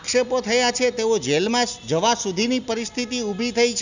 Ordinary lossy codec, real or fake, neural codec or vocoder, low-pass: none; real; none; 7.2 kHz